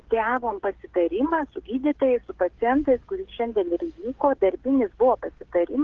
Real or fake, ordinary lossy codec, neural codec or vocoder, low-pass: fake; Opus, 16 kbps; codec, 16 kHz, 16 kbps, FreqCodec, smaller model; 7.2 kHz